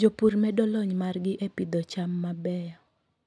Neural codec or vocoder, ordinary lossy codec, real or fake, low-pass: none; none; real; none